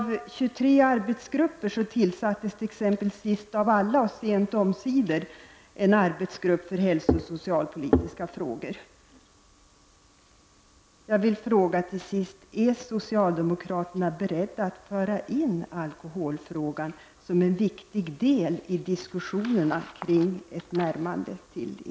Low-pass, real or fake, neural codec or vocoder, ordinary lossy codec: none; real; none; none